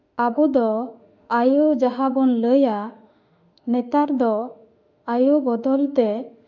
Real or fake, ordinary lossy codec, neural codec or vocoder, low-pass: fake; none; autoencoder, 48 kHz, 32 numbers a frame, DAC-VAE, trained on Japanese speech; 7.2 kHz